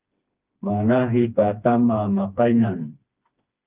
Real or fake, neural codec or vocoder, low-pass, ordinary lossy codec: fake; codec, 16 kHz, 2 kbps, FreqCodec, smaller model; 3.6 kHz; Opus, 32 kbps